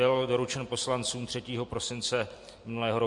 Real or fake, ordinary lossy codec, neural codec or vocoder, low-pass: real; MP3, 48 kbps; none; 10.8 kHz